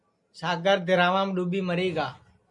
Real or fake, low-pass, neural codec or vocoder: real; 10.8 kHz; none